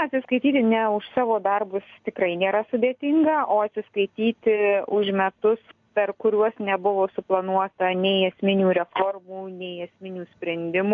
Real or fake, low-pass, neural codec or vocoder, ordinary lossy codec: real; 7.2 kHz; none; AAC, 48 kbps